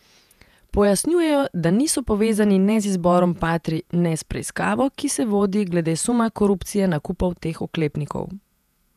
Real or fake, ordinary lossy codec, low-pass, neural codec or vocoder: fake; none; 14.4 kHz; vocoder, 48 kHz, 128 mel bands, Vocos